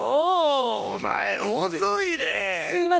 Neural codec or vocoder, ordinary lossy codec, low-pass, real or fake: codec, 16 kHz, 1 kbps, X-Codec, HuBERT features, trained on LibriSpeech; none; none; fake